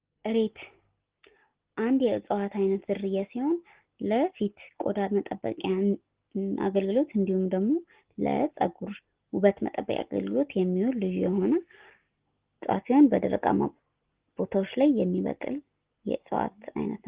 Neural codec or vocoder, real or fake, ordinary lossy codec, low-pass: none; real; Opus, 16 kbps; 3.6 kHz